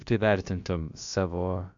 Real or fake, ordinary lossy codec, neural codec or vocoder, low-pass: fake; MP3, 64 kbps; codec, 16 kHz, about 1 kbps, DyCAST, with the encoder's durations; 7.2 kHz